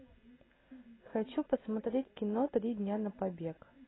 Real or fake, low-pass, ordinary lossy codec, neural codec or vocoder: real; 7.2 kHz; AAC, 16 kbps; none